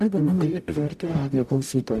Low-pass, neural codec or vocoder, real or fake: 14.4 kHz; codec, 44.1 kHz, 0.9 kbps, DAC; fake